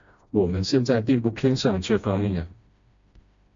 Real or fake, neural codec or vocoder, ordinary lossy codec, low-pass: fake; codec, 16 kHz, 1 kbps, FreqCodec, smaller model; MP3, 48 kbps; 7.2 kHz